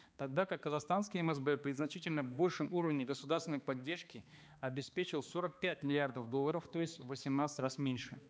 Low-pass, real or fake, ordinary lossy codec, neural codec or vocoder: none; fake; none; codec, 16 kHz, 2 kbps, X-Codec, HuBERT features, trained on balanced general audio